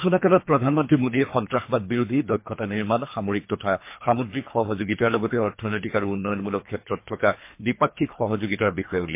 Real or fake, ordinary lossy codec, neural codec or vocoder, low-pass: fake; MP3, 24 kbps; codec, 24 kHz, 3 kbps, HILCodec; 3.6 kHz